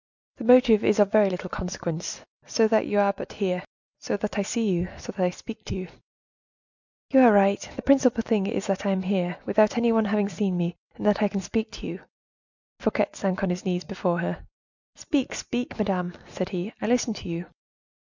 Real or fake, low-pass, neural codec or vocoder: real; 7.2 kHz; none